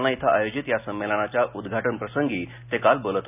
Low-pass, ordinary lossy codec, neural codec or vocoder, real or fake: 3.6 kHz; none; none; real